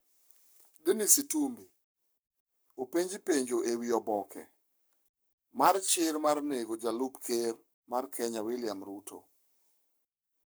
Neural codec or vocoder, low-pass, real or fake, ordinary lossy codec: codec, 44.1 kHz, 7.8 kbps, Pupu-Codec; none; fake; none